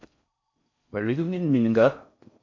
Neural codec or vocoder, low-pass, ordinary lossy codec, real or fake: codec, 16 kHz in and 24 kHz out, 0.6 kbps, FocalCodec, streaming, 4096 codes; 7.2 kHz; MP3, 48 kbps; fake